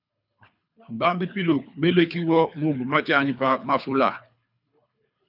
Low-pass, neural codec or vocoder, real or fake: 5.4 kHz; codec, 24 kHz, 3 kbps, HILCodec; fake